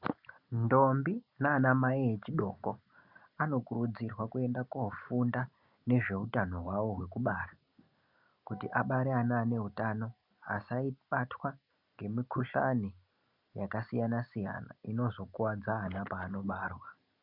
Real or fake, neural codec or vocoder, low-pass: real; none; 5.4 kHz